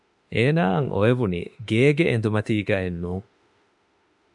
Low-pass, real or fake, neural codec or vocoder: 10.8 kHz; fake; autoencoder, 48 kHz, 32 numbers a frame, DAC-VAE, trained on Japanese speech